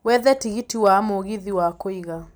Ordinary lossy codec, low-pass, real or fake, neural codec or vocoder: none; none; real; none